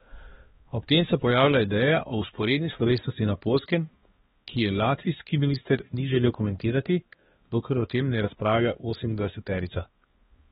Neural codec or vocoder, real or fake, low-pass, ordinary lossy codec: codec, 16 kHz, 2 kbps, X-Codec, HuBERT features, trained on balanced general audio; fake; 7.2 kHz; AAC, 16 kbps